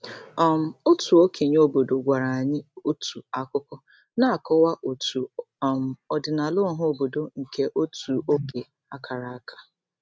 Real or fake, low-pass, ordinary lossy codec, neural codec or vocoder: real; none; none; none